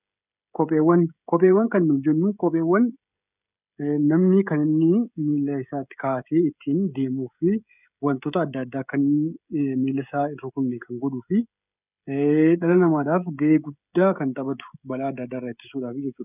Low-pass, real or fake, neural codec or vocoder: 3.6 kHz; fake; codec, 16 kHz, 16 kbps, FreqCodec, smaller model